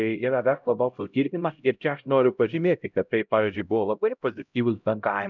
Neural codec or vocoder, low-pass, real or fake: codec, 16 kHz, 0.5 kbps, X-Codec, HuBERT features, trained on LibriSpeech; 7.2 kHz; fake